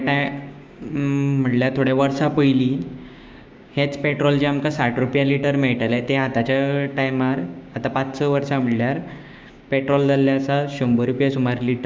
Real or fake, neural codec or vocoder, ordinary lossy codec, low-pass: fake; codec, 16 kHz, 6 kbps, DAC; none; none